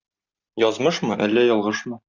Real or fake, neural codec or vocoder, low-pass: real; none; 7.2 kHz